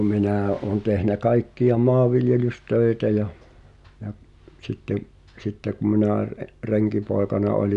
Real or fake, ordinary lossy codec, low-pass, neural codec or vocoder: real; none; 10.8 kHz; none